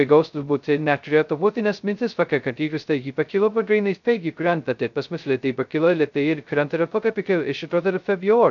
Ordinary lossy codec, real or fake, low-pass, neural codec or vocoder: MP3, 96 kbps; fake; 7.2 kHz; codec, 16 kHz, 0.2 kbps, FocalCodec